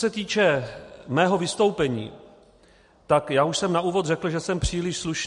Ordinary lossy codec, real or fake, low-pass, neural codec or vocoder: MP3, 48 kbps; real; 14.4 kHz; none